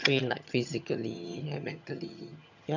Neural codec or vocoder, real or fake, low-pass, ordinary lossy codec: vocoder, 22.05 kHz, 80 mel bands, HiFi-GAN; fake; 7.2 kHz; none